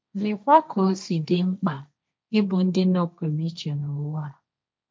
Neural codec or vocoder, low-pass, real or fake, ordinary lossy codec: codec, 16 kHz, 1.1 kbps, Voila-Tokenizer; none; fake; none